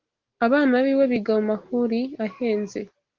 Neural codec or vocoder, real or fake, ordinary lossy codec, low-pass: none; real; Opus, 16 kbps; 7.2 kHz